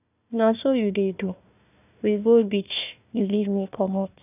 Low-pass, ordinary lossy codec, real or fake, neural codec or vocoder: 3.6 kHz; none; fake; codec, 16 kHz, 1 kbps, FunCodec, trained on Chinese and English, 50 frames a second